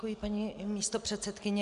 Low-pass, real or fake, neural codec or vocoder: 10.8 kHz; real; none